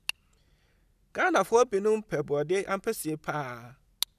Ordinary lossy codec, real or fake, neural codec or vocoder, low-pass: none; real; none; 14.4 kHz